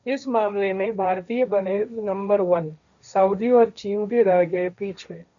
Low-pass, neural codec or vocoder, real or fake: 7.2 kHz; codec, 16 kHz, 1.1 kbps, Voila-Tokenizer; fake